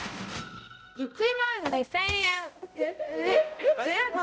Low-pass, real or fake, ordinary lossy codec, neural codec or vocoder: none; fake; none; codec, 16 kHz, 0.5 kbps, X-Codec, HuBERT features, trained on balanced general audio